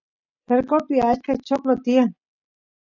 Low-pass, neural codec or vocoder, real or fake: 7.2 kHz; none; real